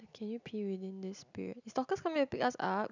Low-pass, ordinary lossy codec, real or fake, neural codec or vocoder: 7.2 kHz; none; real; none